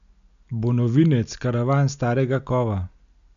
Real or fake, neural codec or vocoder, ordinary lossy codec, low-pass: real; none; AAC, 96 kbps; 7.2 kHz